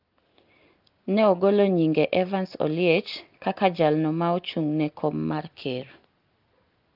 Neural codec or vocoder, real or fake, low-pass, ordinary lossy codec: none; real; 5.4 kHz; Opus, 32 kbps